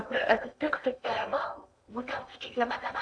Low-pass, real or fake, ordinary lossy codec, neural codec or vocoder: 9.9 kHz; fake; AAC, 64 kbps; codec, 16 kHz in and 24 kHz out, 0.8 kbps, FocalCodec, streaming, 65536 codes